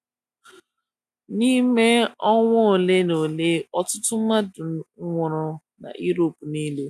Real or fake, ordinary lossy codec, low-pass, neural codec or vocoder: real; none; 14.4 kHz; none